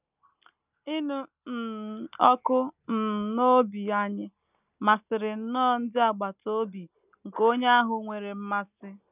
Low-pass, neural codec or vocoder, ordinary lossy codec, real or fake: 3.6 kHz; none; none; real